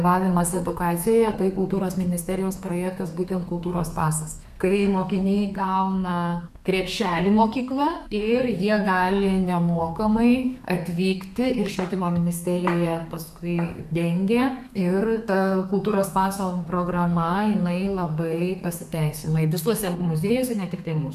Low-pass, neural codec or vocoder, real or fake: 14.4 kHz; codec, 32 kHz, 1.9 kbps, SNAC; fake